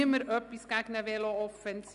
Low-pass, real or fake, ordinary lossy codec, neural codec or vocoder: 10.8 kHz; real; none; none